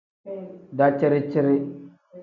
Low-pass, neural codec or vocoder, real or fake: 7.2 kHz; none; real